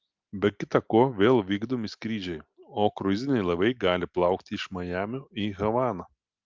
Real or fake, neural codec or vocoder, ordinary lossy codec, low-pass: real; none; Opus, 24 kbps; 7.2 kHz